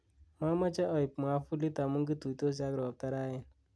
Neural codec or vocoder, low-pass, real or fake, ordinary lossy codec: none; none; real; none